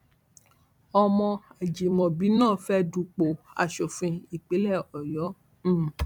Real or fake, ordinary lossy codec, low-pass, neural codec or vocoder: fake; none; 19.8 kHz; vocoder, 44.1 kHz, 128 mel bands every 256 samples, BigVGAN v2